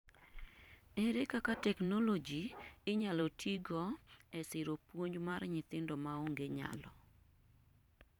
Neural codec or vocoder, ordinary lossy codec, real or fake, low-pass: vocoder, 44.1 kHz, 128 mel bands every 512 samples, BigVGAN v2; none; fake; 19.8 kHz